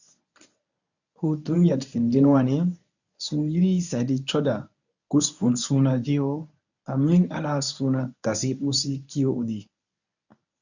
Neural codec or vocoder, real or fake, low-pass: codec, 24 kHz, 0.9 kbps, WavTokenizer, medium speech release version 1; fake; 7.2 kHz